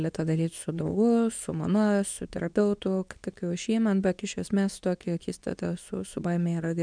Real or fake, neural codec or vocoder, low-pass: fake; codec, 24 kHz, 0.9 kbps, WavTokenizer, medium speech release version 2; 9.9 kHz